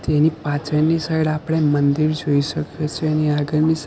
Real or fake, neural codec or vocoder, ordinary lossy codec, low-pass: real; none; none; none